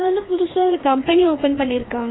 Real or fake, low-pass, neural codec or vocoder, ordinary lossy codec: fake; 7.2 kHz; codec, 16 kHz, 2 kbps, FreqCodec, larger model; AAC, 16 kbps